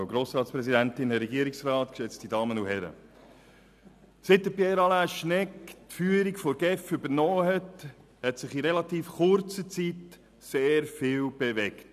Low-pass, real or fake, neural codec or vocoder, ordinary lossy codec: 14.4 kHz; real; none; none